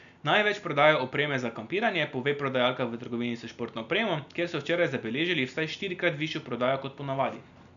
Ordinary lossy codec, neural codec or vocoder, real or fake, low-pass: none; none; real; 7.2 kHz